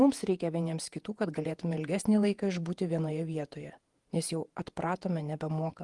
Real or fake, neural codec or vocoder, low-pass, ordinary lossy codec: fake; vocoder, 48 kHz, 128 mel bands, Vocos; 10.8 kHz; Opus, 24 kbps